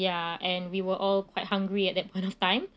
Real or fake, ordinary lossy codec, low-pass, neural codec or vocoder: real; none; none; none